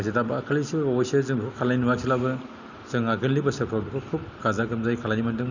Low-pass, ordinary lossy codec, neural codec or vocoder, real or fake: 7.2 kHz; none; none; real